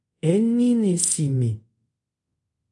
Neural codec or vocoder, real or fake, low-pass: codec, 24 kHz, 0.5 kbps, DualCodec; fake; 10.8 kHz